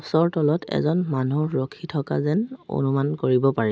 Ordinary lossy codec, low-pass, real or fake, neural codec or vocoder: none; none; real; none